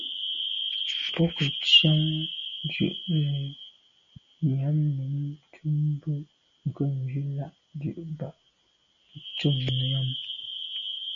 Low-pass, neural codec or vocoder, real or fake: 7.2 kHz; none; real